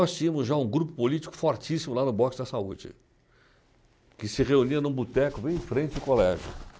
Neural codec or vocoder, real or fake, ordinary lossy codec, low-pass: none; real; none; none